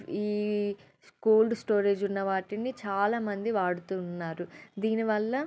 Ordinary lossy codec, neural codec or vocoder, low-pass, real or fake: none; none; none; real